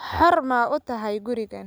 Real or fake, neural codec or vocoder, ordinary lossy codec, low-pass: real; none; none; none